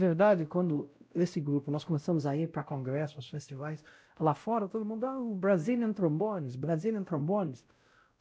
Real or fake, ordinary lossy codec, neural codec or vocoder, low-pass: fake; none; codec, 16 kHz, 0.5 kbps, X-Codec, WavLM features, trained on Multilingual LibriSpeech; none